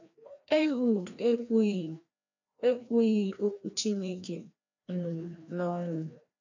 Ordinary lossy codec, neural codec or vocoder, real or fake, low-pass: none; codec, 16 kHz, 1 kbps, FreqCodec, larger model; fake; 7.2 kHz